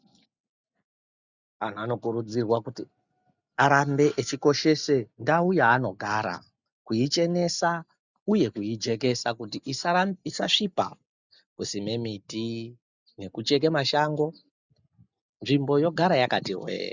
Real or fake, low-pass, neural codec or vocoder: real; 7.2 kHz; none